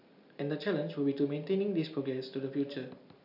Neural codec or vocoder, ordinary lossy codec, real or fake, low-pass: none; none; real; 5.4 kHz